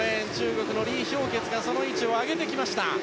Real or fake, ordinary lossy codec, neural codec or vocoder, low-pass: real; none; none; none